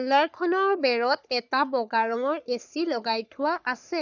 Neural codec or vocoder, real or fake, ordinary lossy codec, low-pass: codec, 44.1 kHz, 3.4 kbps, Pupu-Codec; fake; none; 7.2 kHz